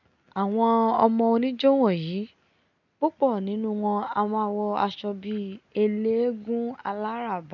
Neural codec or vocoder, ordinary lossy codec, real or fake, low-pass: none; none; real; 7.2 kHz